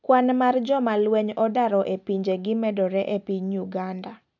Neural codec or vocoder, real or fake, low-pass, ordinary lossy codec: none; real; 7.2 kHz; none